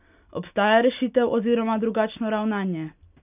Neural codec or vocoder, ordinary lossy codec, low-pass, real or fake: none; none; 3.6 kHz; real